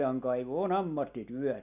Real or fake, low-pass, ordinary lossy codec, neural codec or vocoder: real; 3.6 kHz; none; none